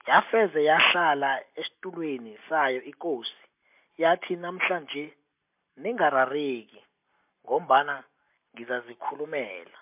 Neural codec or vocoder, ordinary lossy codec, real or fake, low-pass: none; MP3, 32 kbps; real; 3.6 kHz